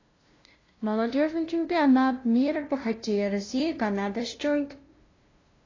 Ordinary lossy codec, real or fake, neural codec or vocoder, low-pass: AAC, 32 kbps; fake; codec, 16 kHz, 0.5 kbps, FunCodec, trained on LibriTTS, 25 frames a second; 7.2 kHz